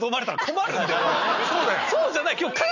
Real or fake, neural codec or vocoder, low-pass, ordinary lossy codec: real; none; 7.2 kHz; none